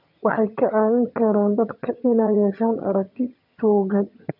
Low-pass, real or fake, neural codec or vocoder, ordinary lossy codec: 5.4 kHz; fake; vocoder, 22.05 kHz, 80 mel bands, HiFi-GAN; none